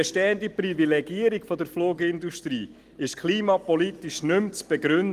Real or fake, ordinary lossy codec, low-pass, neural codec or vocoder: real; Opus, 24 kbps; 14.4 kHz; none